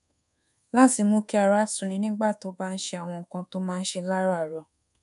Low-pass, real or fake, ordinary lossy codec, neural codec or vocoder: 10.8 kHz; fake; none; codec, 24 kHz, 1.2 kbps, DualCodec